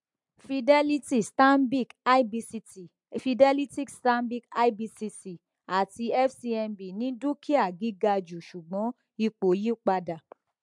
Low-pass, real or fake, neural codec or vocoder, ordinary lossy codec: 10.8 kHz; real; none; MP3, 64 kbps